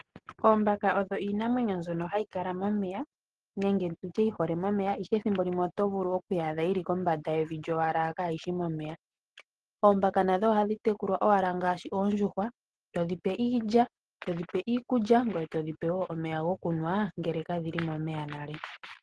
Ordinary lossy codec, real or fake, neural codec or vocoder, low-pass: Opus, 16 kbps; real; none; 10.8 kHz